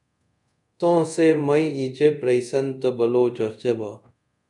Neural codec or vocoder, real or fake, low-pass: codec, 24 kHz, 0.5 kbps, DualCodec; fake; 10.8 kHz